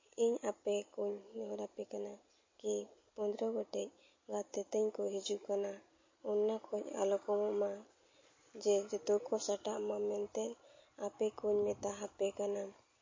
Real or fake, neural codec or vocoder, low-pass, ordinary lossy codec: real; none; 7.2 kHz; MP3, 32 kbps